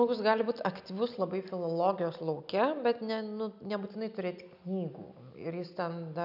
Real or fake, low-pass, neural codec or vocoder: real; 5.4 kHz; none